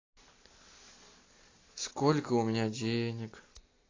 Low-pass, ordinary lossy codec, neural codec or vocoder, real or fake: 7.2 kHz; AAC, 32 kbps; none; real